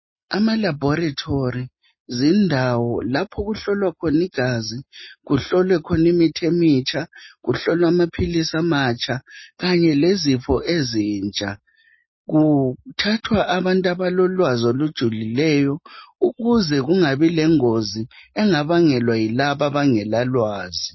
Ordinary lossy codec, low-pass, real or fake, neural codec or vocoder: MP3, 24 kbps; 7.2 kHz; real; none